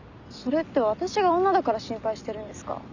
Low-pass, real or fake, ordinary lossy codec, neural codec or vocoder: 7.2 kHz; real; none; none